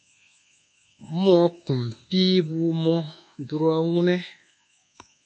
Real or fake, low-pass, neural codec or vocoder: fake; 9.9 kHz; codec, 24 kHz, 1.2 kbps, DualCodec